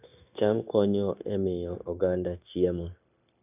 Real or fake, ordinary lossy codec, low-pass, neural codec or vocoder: fake; none; 3.6 kHz; codec, 16 kHz, 0.9 kbps, LongCat-Audio-Codec